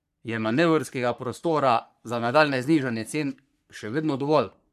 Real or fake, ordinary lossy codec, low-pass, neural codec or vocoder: fake; none; 14.4 kHz; codec, 44.1 kHz, 3.4 kbps, Pupu-Codec